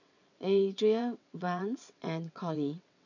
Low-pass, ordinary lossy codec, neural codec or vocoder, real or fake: 7.2 kHz; none; vocoder, 22.05 kHz, 80 mel bands, WaveNeXt; fake